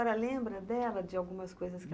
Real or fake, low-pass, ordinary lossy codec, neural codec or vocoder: real; none; none; none